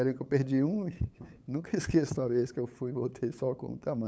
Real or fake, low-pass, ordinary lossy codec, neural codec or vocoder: fake; none; none; codec, 16 kHz, 8 kbps, FunCodec, trained on LibriTTS, 25 frames a second